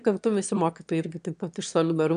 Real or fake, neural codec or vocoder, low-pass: fake; autoencoder, 22.05 kHz, a latent of 192 numbers a frame, VITS, trained on one speaker; 9.9 kHz